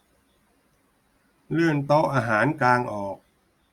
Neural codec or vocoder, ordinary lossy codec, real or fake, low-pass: none; Opus, 64 kbps; real; 19.8 kHz